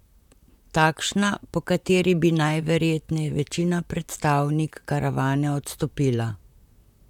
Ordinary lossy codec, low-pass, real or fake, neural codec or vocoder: none; 19.8 kHz; fake; vocoder, 44.1 kHz, 128 mel bands, Pupu-Vocoder